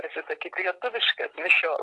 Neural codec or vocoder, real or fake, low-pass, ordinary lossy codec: none; real; 10.8 kHz; AAC, 48 kbps